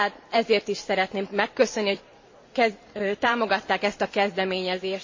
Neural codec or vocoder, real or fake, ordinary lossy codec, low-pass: vocoder, 44.1 kHz, 128 mel bands every 512 samples, BigVGAN v2; fake; MP3, 32 kbps; 7.2 kHz